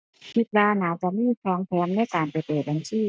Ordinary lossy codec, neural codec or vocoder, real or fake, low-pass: none; none; real; none